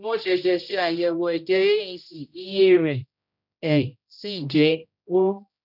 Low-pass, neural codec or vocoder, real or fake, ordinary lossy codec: 5.4 kHz; codec, 16 kHz, 0.5 kbps, X-Codec, HuBERT features, trained on general audio; fake; none